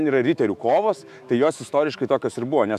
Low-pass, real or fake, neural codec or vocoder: 14.4 kHz; fake; autoencoder, 48 kHz, 128 numbers a frame, DAC-VAE, trained on Japanese speech